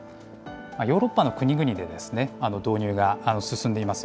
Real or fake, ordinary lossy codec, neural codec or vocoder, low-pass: real; none; none; none